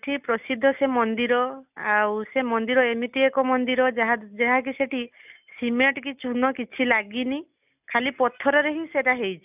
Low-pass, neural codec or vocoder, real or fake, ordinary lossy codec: 3.6 kHz; none; real; none